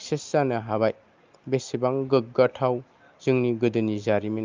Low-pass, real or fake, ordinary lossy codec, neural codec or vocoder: 7.2 kHz; real; Opus, 24 kbps; none